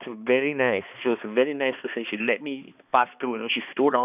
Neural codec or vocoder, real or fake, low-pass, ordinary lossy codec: codec, 16 kHz, 2 kbps, X-Codec, HuBERT features, trained on balanced general audio; fake; 3.6 kHz; none